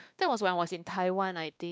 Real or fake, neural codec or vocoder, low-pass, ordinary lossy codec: fake; codec, 16 kHz, 2 kbps, X-Codec, WavLM features, trained on Multilingual LibriSpeech; none; none